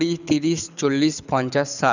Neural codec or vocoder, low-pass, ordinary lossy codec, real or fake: codec, 24 kHz, 6 kbps, HILCodec; 7.2 kHz; none; fake